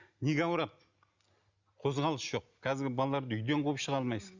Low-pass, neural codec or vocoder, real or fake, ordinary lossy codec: 7.2 kHz; none; real; Opus, 64 kbps